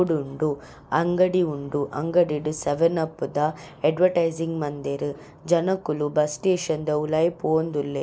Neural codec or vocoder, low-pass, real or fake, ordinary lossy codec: none; none; real; none